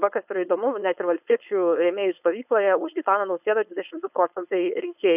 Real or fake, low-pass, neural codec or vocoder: fake; 3.6 kHz; codec, 16 kHz, 4.8 kbps, FACodec